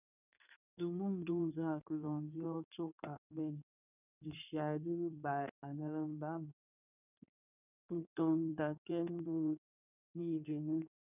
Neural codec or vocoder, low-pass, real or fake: codec, 16 kHz in and 24 kHz out, 2.2 kbps, FireRedTTS-2 codec; 3.6 kHz; fake